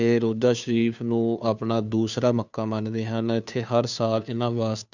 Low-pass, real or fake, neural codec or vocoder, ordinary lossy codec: 7.2 kHz; fake; codec, 16 kHz, 2 kbps, FunCodec, trained on Chinese and English, 25 frames a second; none